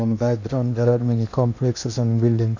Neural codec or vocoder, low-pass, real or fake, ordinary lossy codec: codec, 16 kHz in and 24 kHz out, 0.8 kbps, FocalCodec, streaming, 65536 codes; 7.2 kHz; fake; none